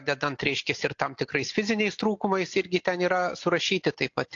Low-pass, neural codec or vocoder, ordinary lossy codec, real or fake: 7.2 kHz; none; AAC, 48 kbps; real